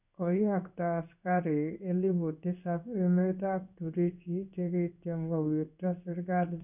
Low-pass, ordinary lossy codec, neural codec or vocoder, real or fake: 3.6 kHz; none; codec, 16 kHz in and 24 kHz out, 1 kbps, XY-Tokenizer; fake